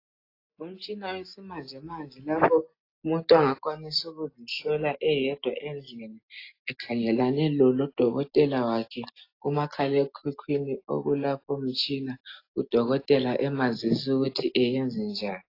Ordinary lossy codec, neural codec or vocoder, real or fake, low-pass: AAC, 32 kbps; none; real; 5.4 kHz